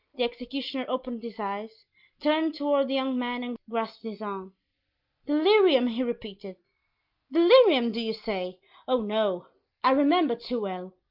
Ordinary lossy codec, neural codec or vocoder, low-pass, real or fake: Opus, 32 kbps; none; 5.4 kHz; real